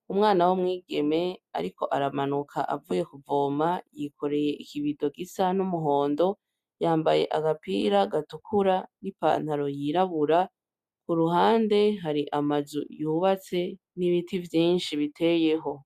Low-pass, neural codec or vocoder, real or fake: 14.4 kHz; none; real